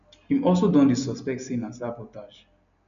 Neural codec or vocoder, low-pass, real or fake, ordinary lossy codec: none; 7.2 kHz; real; none